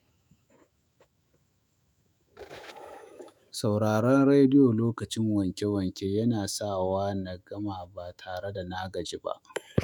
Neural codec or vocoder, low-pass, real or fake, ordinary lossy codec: autoencoder, 48 kHz, 128 numbers a frame, DAC-VAE, trained on Japanese speech; 19.8 kHz; fake; none